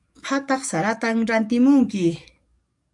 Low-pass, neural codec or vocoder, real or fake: 10.8 kHz; codec, 44.1 kHz, 7.8 kbps, DAC; fake